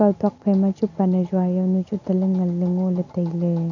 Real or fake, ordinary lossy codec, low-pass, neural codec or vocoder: real; none; 7.2 kHz; none